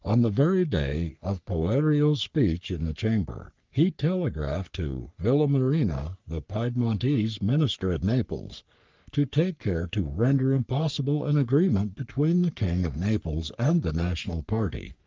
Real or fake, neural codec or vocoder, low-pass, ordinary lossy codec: fake; codec, 44.1 kHz, 3.4 kbps, Pupu-Codec; 7.2 kHz; Opus, 24 kbps